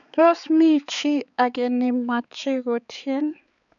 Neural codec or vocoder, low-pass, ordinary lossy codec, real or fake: codec, 16 kHz, 4 kbps, X-Codec, HuBERT features, trained on balanced general audio; 7.2 kHz; none; fake